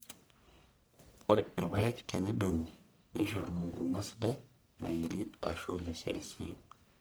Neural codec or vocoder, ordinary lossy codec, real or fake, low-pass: codec, 44.1 kHz, 1.7 kbps, Pupu-Codec; none; fake; none